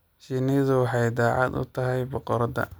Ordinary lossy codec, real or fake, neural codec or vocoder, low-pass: none; real; none; none